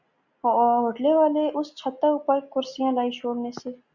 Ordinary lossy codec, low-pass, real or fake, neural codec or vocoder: Opus, 64 kbps; 7.2 kHz; real; none